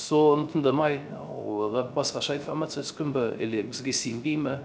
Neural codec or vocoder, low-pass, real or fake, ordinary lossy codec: codec, 16 kHz, 0.3 kbps, FocalCodec; none; fake; none